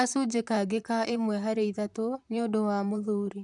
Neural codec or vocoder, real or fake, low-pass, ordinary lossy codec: vocoder, 44.1 kHz, 128 mel bands, Pupu-Vocoder; fake; 10.8 kHz; none